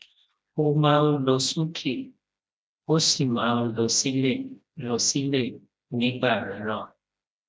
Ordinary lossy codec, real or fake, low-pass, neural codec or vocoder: none; fake; none; codec, 16 kHz, 1 kbps, FreqCodec, smaller model